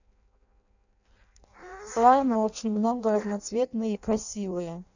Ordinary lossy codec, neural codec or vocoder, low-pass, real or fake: none; codec, 16 kHz in and 24 kHz out, 0.6 kbps, FireRedTTS-2 codec; 7.2 kHz; fake